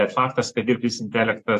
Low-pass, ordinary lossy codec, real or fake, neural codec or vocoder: 14.4 kHz; AAC, 64 kbps; fake; vocoder, 44.1 kHz, 128 mel bands every 512 samples, BigVGAN v2